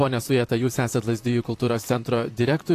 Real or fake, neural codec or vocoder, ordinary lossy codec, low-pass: fake; vocoder, 44.1 kHz, 128 mel bands, Pupu-Vocoder; AAC, 64 kbps; 14.4 kHz